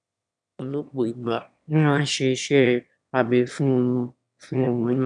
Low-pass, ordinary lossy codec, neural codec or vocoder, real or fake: 9.9 kHz; none; autoencoder, 22.05 kHz, a latent of 192 numbers a frame, VITS, trained on one speaker; fake